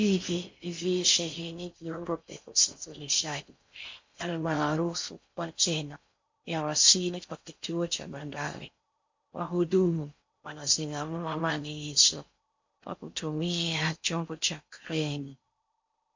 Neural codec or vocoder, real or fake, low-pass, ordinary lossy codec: codec, 16 kHz in and 24 kHz out, 0.6 kbps, FocalCodec, streaming, 4096 codes; fake; 7.2 kHz; MP3, 48 kbps